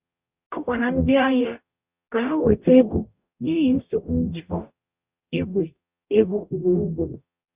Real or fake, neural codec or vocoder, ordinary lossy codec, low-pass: fake; codec, 44.1 kHz, 0.9 kbps, DAC; Opus, 64 kbps; 3.6 kHz